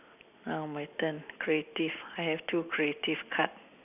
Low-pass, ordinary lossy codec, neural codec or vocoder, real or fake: 3.6 kHz; none; none; real